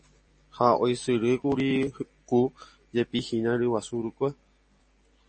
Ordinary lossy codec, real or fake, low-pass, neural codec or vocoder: MP3, 32 kbps; real; 9.9 kHz; none